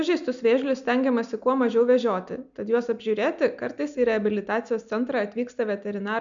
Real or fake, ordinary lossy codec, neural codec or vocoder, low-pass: real; MP3, 64 kbps; none; 7.2 kHz